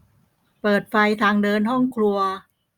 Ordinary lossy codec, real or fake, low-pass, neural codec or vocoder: Opus, 64 kbps; real; 19.8 kHz; none